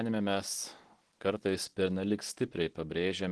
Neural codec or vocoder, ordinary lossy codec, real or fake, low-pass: none; Opus, 16 kbps; real; 10.8 kHz